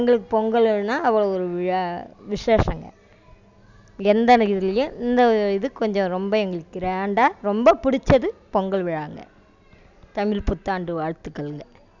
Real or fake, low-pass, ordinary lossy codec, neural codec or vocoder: real; 7.2 kHz; none; none